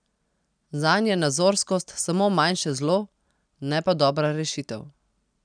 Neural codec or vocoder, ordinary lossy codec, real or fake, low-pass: none; none; real; 9.9 kHz